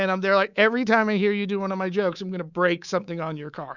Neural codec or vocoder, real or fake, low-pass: codec, 24 kHz, 3.1 kbps, DualCodec; fake; 7.2 kHz